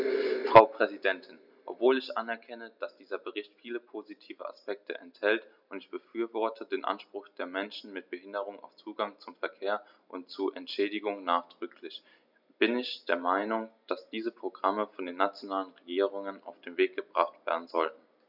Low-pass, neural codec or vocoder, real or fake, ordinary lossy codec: 5.4 kHz; none; real; none